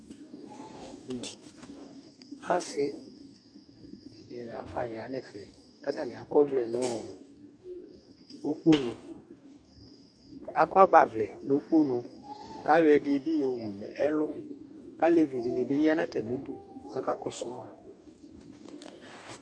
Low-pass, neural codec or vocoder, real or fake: 9.9 kHz; codec, 44.1 kHz, 2.6 kbps, DAC; fake